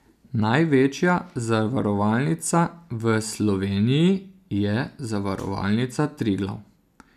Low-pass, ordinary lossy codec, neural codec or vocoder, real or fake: 14.4 kHz; none; none; real